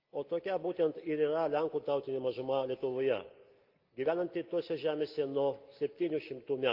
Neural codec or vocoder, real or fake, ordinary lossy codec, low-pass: none; real; Opus, 24 kbps; 5.4 kHz